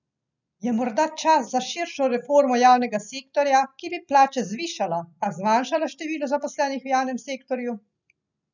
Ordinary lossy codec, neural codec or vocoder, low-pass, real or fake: none; none; 7.2 kHz; real